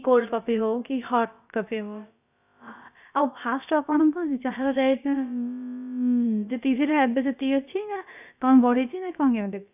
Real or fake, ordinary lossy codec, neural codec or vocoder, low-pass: fake; none; codec, 16 kHz, about 1 kbps, DyCAST, with the encoder's durations; 3.6 kHz